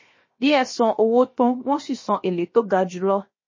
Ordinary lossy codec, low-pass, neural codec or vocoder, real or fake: MP3, 32 kbps; 7.2 kHz; codec, 16 kHz, 0.7 kbps, FocalCodec; fake